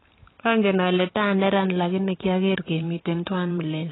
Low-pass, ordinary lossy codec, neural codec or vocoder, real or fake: 7.2 kHz; AAC, 16 kbps; vocoder, 44.1 kHz, 128 mel bands every 512 samples, BigVGAN v2; fake